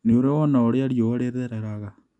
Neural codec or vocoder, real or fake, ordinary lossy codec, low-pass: none; real; none; 10.8 kHz